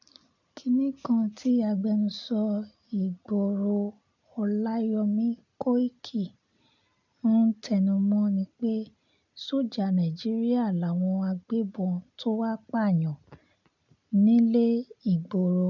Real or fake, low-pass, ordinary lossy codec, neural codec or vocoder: real; 7.2 kHz; none; none